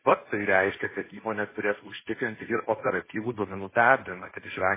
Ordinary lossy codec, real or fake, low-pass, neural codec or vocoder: MP3, 16 kbps; fake; 3.6 kHz; codec, 16 kHz, 1.1 kbps, Voila-Tokenizer